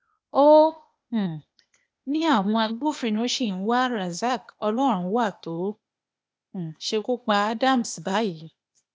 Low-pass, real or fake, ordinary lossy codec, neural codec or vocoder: none; fake; none; codec, 16 kHz, 0.8 kbps, ZipCodec